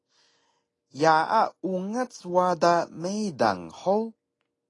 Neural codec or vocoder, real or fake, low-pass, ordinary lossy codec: none; real; 10.8 kHz; AAC, 32 kbps